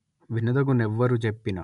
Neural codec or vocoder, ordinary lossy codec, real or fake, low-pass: none; none; real; 10.8 kHz